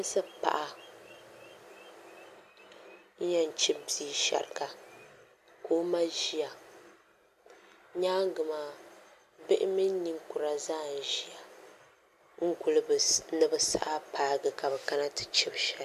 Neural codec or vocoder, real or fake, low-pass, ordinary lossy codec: none; real; 14.4 kHz; MP3, 96 kbps